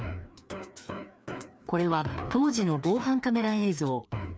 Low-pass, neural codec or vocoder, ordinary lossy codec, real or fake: none; codec, 16 kHz, 2 kbps, FreqCodec, larger model; none; fake